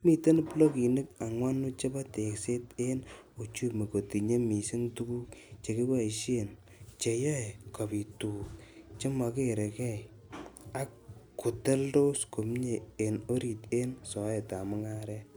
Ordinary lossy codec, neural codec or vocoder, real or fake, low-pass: none; none; real; none